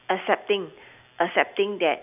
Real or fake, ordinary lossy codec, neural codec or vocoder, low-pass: real; none; none; 3.6 kHz